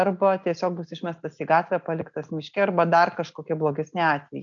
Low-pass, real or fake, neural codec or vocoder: 7.2 kHz; real; none